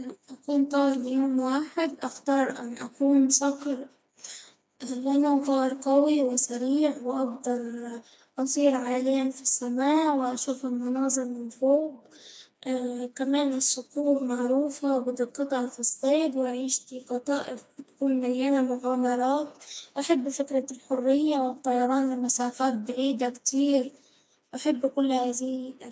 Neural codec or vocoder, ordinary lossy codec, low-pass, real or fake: codec, 16 kHz, 2 kbps, FreqCodec, smaller model; none; none; fake